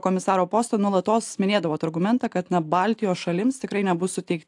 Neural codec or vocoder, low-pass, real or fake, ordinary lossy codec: none; 10.8 kHz; real; AAC, 64 kbps